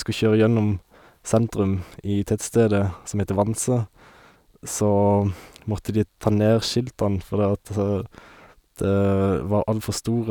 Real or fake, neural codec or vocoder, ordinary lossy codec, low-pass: real; none; none; 19.8 kHz